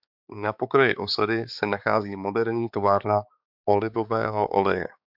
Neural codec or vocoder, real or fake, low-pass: codec, 16 kHz, 4 kbps, X-Codec, HuBERT features, trained on balanced general audio; fake; 5.4 kHz